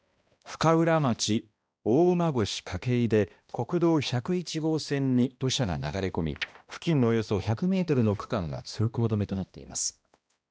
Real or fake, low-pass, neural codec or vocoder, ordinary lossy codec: fake; none; codec, 16 kHz, 1 kbps, X-Codec, HuBERT features, trained on balanced general audio; none